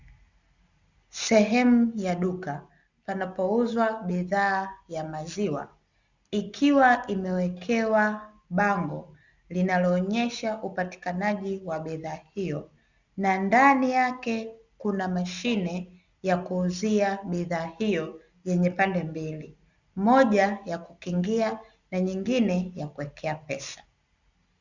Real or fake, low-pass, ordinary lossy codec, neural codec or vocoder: real; 7.2 kHz; Opus, 64 kbps; none